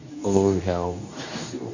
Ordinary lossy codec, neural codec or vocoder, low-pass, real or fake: none; codec, 24 kHz, 0.9 kbps, WavTokenizer, medium speech release version 2; 7.2 kHz; fake